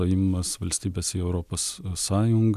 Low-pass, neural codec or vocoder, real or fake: 14.4 kHz; none; real